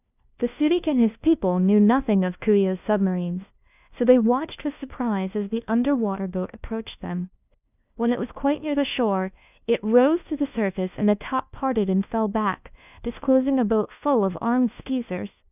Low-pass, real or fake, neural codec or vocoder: 3.6 kHz; fake; codec, 16 kHz, 1 kbps, FunCodec, trained on LibriTTS, 50 frames a second